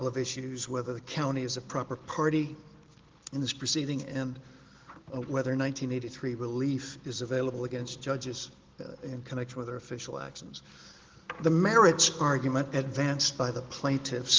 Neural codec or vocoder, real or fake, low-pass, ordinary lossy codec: none; real; 7.2 kHz; Opus, 16 kbps